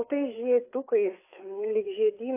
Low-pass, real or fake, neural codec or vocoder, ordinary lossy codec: 3.6 kHz; fake; codec, 16 kHz, 4 kbps, FreqCodec, larger model; AAC, 24 kbps